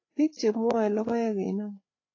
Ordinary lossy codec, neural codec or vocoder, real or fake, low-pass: AAC, 32 kbps; codec, 16 kHz, 8 kbps, FreqCodec, larger model; fake; 7.2 kHz